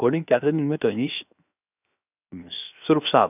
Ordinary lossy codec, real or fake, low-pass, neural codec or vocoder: none; fake; 3.6 kHz; codec, 16 kHz, 0.7 kbps, FocalCodec